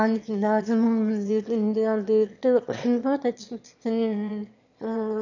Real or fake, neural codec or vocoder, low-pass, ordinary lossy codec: fake; autoencoder, 22.05 kHz, a latent of 192 numbers a frame, VITS, trained on one speaker; 7.2 kHz; none